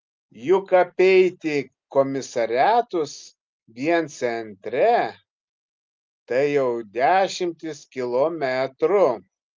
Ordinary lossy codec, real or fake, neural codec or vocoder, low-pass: Opus, 24 kbps; real; none; 7.2 kHz